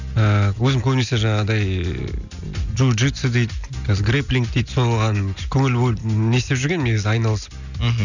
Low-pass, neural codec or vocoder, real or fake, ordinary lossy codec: 7.2 kHz; none; real; none